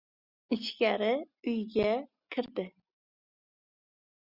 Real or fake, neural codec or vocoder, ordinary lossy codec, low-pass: real; none; Opus, 64 kbps; 5.4 kHz